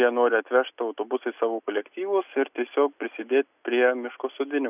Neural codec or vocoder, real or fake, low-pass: none; real; 3.6 kHz